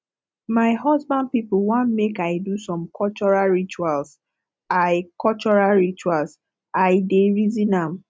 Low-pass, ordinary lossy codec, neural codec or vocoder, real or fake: none; none; none; real